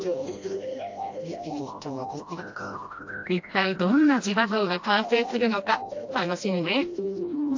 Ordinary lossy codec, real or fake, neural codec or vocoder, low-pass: AAC, 48 kbps; fake; codec, 16 kHz, 1 kbps, FreqCodec, smaller model; 7.2 kHz